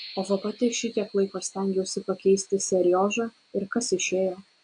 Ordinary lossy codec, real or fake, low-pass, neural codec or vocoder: AAC, 64 kbps; real; 9.9 kHz; none